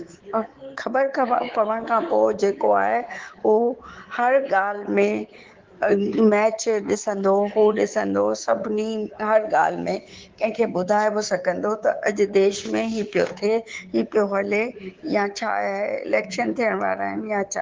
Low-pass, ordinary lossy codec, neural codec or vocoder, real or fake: 7.2 kHz; Opus, 16 kbps; codec, 24 kHz, 3.1 kbps, DualCodec; fake